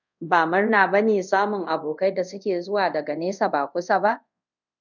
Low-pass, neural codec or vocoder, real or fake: 7.2 kHz; codec, 24 kHz, 0.5 kbps, DualCodec; fake